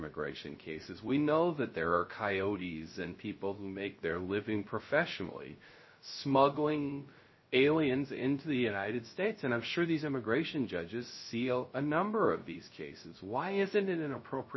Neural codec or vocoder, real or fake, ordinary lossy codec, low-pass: codec, 16 kHz, 0.3 kbps, FocalCodec; fake; MP3, 24 kbps; 7.2 kHz